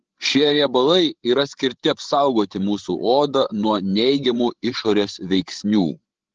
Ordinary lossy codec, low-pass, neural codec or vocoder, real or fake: Opus, 16 kbps; 7.2 kHz; codec, 16 kHz, 16 kbps, FreqCodec, larger model; fake